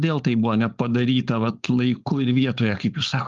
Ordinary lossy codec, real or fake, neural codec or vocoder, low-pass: Opus, 32 kbps; fake; codec, 16 kHz, 4.8 kbps, FACodec; 7.2 kHz